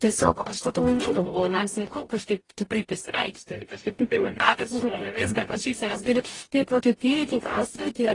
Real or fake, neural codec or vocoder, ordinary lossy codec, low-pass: fake; codec, 44.1 kHz, 0.9 kbps, DAC; AAC, 32 kbps; 10.8 kHz